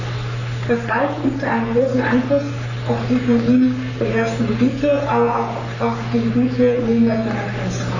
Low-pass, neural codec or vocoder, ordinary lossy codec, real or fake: 7.2 kHz; codec, 44.1 kHz, 3.4 kbps, Pupu-Codec; none; fake